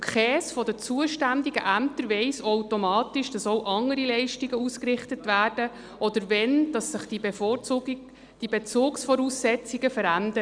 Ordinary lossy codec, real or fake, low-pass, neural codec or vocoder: Opus, 64 kbps; real; 9.9 kHz; none